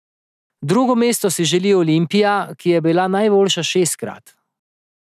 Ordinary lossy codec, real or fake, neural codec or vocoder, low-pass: none; real; none; 14.4 kHz